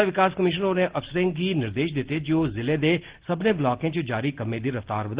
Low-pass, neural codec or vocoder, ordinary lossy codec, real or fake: 3.6 kHz; none; Opus, 16 kbps; real